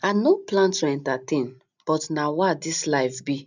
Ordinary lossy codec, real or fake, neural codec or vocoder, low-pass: none; real; none; 7.2 kHz